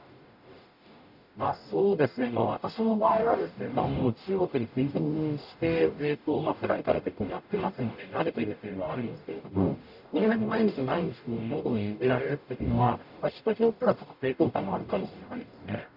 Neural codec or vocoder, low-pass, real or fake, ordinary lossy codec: codec, 44.1 kHz, 0.9 kbps, DAC; 5.4 kHz; fake; none